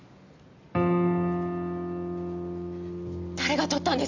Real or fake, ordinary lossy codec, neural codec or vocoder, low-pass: real; AAC, 48 kbps; none; 7.2 kHz